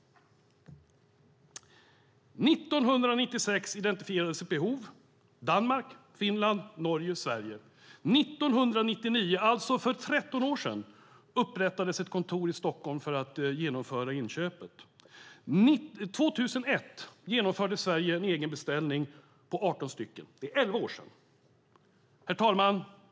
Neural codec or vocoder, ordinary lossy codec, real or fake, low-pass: none; none; real; none